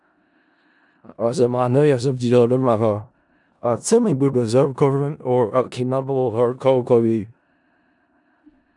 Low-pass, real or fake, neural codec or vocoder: 10.8 kHz; fake; codec, 16 kHz in and 24 kHz out, 0.4 kbps, LongCat-Audio-Codec, four codebook decoder